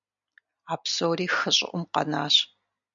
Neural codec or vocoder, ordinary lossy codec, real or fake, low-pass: none; MP3, 96 kbps; real; 7.2 kHz